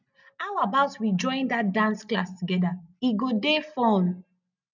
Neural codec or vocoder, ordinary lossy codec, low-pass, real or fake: none; none; 7.2 kHz; real